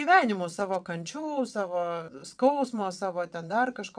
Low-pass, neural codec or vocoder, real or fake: 9.9 kHz; vocoder, 22.05 kHz, 80 mel bands, WaveNeXt; fake